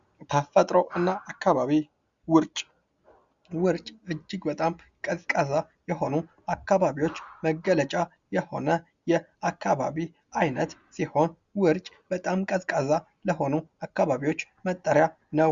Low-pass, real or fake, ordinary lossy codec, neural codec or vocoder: 7.2 kHz; real; Opus, 64 kbps; none